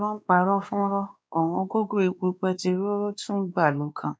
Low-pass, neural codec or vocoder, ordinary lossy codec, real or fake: none; codec, 16 kHz, 2 kbps, X-Codec, WavLM features, trained on Multilingual LibriSpeech; none; fake